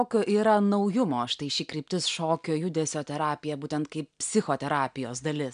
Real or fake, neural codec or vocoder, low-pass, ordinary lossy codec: real; none; 9.9 kHz; MP3, 96 kbps